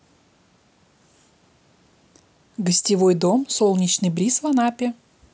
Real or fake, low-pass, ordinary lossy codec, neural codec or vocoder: real; none; none; none